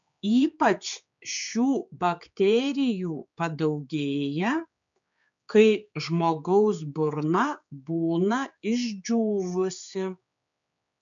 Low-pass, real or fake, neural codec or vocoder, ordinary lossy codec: 7.2 kHz; fake; codec, 16 kHz, 4 kbps, X-Codec, HuBERT features, trained on general audio; MP3, 64 kbps